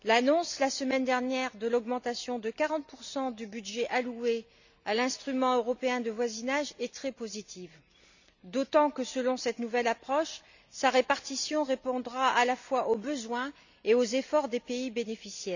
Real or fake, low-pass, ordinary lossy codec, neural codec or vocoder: real; 7.2 kHz; none; none